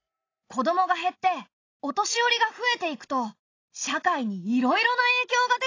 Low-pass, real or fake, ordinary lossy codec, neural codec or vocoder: 7.2 kHz; real; AAC, 32 kbps; none